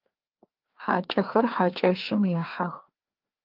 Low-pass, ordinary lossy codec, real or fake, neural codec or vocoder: 5.4 kHz; Opus, 24 kbps; fake; codec, 16 kHz, 2 kbps, FreqCodec, larger model